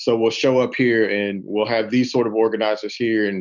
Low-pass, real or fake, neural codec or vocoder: 7.2 kHz; real; none